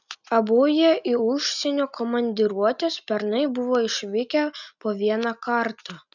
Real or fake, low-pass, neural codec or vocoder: real; 7.2 kHz; none